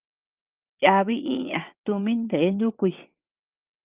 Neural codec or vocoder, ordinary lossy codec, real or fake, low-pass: vocoder, 22.05 kHz, 80 mel bands, WaveNeXt; Opus, 24 kbps; fake; 3.6 kHz